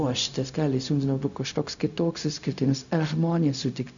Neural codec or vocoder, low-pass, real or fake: codec, 16 kHz, 0.4 kbps, LongCat-Audio-Codec; 7.2 kHz; fake